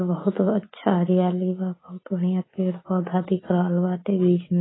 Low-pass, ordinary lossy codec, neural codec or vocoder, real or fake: 7.2 kHz; AAC, 16 kbps; none; real